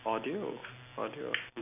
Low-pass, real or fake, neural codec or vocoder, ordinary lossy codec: 3.6 kHz; real; none; none